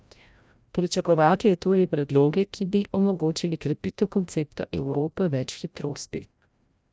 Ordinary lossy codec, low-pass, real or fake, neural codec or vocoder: none; none; fake; codec, 16 kHz, 0.5 kbps, FreqCodec, larger model